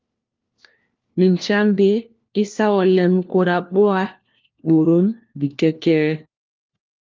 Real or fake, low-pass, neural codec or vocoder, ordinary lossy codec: fake; 7.2 kHz; codec, 16 kHz, 1 kbps, FunCodec, trained on LibriTTS, 50 frames a second; Opus, 24 kbps